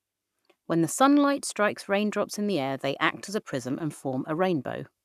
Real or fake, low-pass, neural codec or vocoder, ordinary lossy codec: fake; 14.4 kHz; codec, 44.1 kHz, 7.8 kbps, Pupu-Codec; none